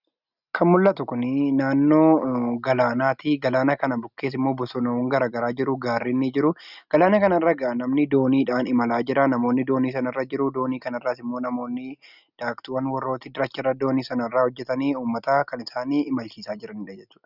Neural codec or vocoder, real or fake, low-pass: none; real; 5.4 kHz